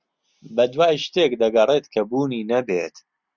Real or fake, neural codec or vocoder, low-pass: real; none; 7.2 kHz